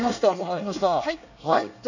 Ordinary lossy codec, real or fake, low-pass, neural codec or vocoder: none; fake; 7.2 kHz; codec, 24 kHz, 1 kbps, SNAC